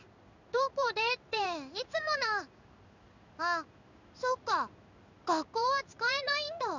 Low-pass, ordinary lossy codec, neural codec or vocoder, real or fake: 7.2 kHz; none; codec, 16 kHz in and 24 kHz out, 1 kbps, XY-Tokenizer; fake